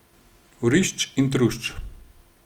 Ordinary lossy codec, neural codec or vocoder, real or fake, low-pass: Opus, 32 kbps; none; real; 19.8 kHz